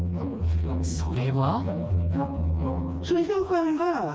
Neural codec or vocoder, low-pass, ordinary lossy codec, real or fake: codec, 16 kHz, 2 kbps, FreqCodec, smaller model; none; none; fake